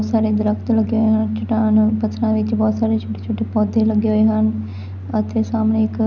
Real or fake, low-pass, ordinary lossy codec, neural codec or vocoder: real; 7.2 kHz; none; none